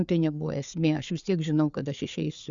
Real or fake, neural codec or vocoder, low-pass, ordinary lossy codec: fake; codec, 16 kHz, 4 kbps, FreqCodec, larger model; 7.2 kHz; Opus, 64 kbps